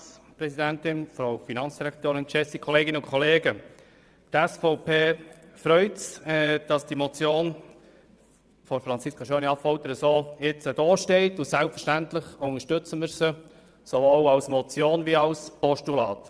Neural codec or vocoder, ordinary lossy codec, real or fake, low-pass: vocoder, 22.05 kHz, 80 mel bands, WaveNeXt; none; fake; none